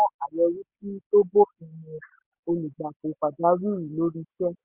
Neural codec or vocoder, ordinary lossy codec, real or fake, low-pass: none; Opus, 64 kbps; real; 3.6 kHz